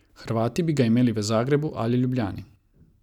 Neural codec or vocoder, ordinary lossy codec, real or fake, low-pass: none; none; real; 19.8 kHz